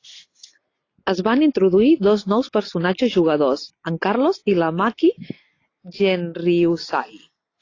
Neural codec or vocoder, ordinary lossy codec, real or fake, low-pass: none; AAC, 32 kbps; real; 7.2 kHz